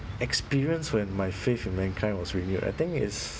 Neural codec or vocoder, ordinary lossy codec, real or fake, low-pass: none; none; real; none